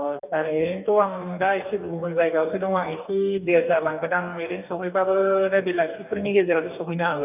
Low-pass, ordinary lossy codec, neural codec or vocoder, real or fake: 3.6 kHz; none; codec, 44.1 kHz, 2.6 kbps, DAC; fake